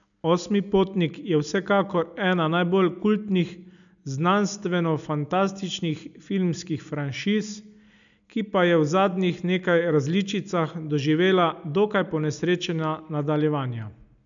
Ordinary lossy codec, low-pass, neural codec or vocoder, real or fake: none; 7.2 kHz; none; real